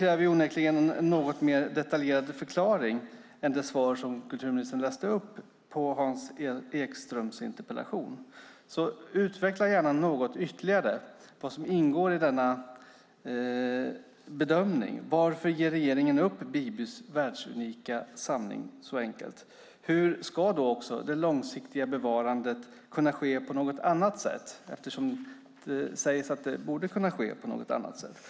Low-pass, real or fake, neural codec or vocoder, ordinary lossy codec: none; real; none; none